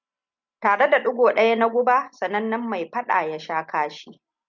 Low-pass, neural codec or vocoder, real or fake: 7.2 kHz; none; real